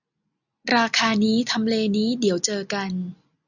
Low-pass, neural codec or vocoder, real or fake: 7.2 kHz; none; real